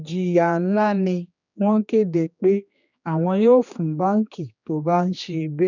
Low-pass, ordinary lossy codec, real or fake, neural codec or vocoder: 7.2 kHz; none; fake; codec, 16 kHz, 2 kbps, X-Codec, HuBERT features, trained on general audio